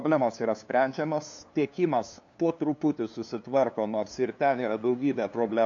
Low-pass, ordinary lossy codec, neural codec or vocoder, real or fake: 7.2 kHz; AAC, 64 kbps; codec, 16 kHz, 2 kbps, FunCodec, trained on LibriTTS, 25 frames a second; fake